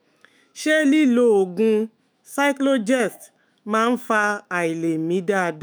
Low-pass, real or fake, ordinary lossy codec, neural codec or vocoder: none; fake; none; autoencoder, 48 kHz, 128 numbers a frame, DAC-VAE, trained on Japanese speech